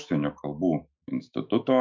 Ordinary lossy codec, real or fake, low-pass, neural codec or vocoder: MP3, 64 kbps; fake; 7.2 kHz; autoencoder, 48 kHz, 128 numbers a frame, DAC-VAE, trained on Japanese speech